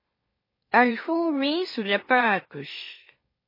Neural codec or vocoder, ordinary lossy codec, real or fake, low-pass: autoencoder, 44.1 kHz, a latent of 192 numbers a frame, MeloTTS; MP3, 24 kbps; fake; 5.4 kHz